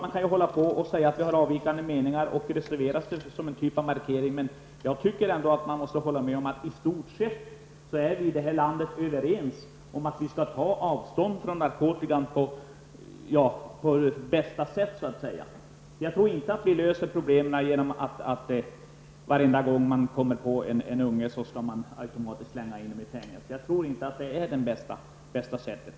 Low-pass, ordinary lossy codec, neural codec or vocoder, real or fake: none; none; none; real